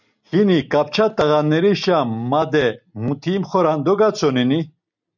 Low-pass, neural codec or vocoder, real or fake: 7.2 kHz; none; real